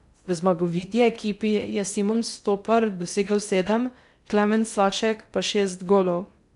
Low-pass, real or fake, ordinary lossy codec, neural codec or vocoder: 10.8 kHz; fake; none; codec, 16 kHz in and 24 kHz out, 0.6 kbps, FocalCodec, streaming, 2048 codes